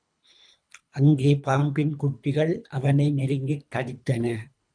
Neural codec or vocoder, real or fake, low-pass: codec, 24 kHz, 3 kbps, HILCodec; fake; 9.9 kHz